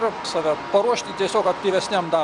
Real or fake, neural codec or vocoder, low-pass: real; none; 10.8 kHz